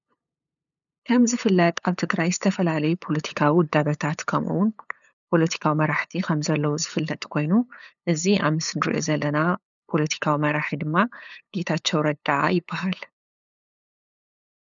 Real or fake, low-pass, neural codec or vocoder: fake; 7.2 kHz; codec, 16 kHz, 8 kbps, FunCodec, trained on LibriTTS, 25 frames a second